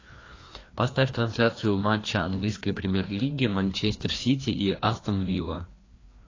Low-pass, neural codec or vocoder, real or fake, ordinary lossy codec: 7.2 kHz; codec, 16 kHz, 2 kbps, FreqCodec, larger model; fake; AAC, 32 kbps